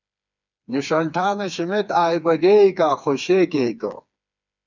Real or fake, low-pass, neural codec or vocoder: fake; 7.2 kHz; codec, 16 kHz, 4 kbps, FreqCodec, smaller model